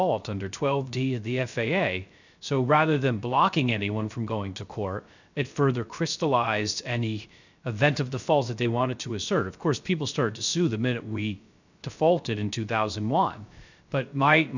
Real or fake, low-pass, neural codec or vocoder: fake; 7.2 kHz; codec, 16 kHz, 0.3 kbps, FocalCodec